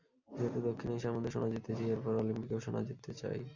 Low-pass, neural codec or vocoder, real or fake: 7.2 kHz; none; real